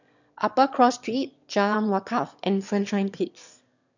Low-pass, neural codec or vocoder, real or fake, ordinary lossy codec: 7.2 kHz; autoencoder, 22.05 kHz, a latent of 192 numbers a frame, VITS, trained on one speaker; fake; none